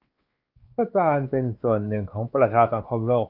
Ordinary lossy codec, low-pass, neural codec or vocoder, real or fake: Opus, 24 kbps; 5.4 kHz; codec, 16 kHz, 2 kbps, X-Codec, WavLM features, trained on Multilingual LibriSpeech; fake